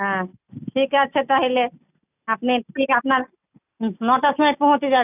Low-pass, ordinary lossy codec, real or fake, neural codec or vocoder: 3.6 kHz; none; real; none